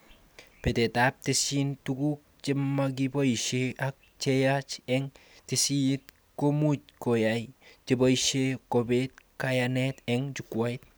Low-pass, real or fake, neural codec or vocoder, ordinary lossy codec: none; real; none; none